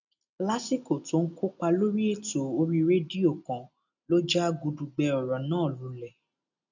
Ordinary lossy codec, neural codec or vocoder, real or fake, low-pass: none; none; real; 7.2 kHz